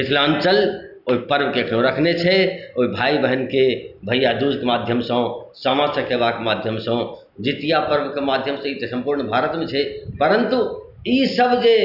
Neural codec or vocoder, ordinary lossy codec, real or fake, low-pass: none; none; real; 5.4 kHz